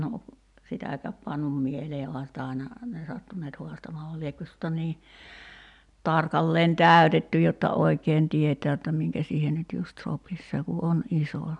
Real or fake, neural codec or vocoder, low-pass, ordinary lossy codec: real; none; 10.8 kHz; none